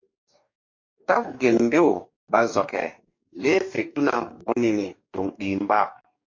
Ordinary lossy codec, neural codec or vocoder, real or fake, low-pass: MP3, 48 kbps; codec, 44.1 kHz, 2.6 kbps, DAC; fake; 7.2 kHz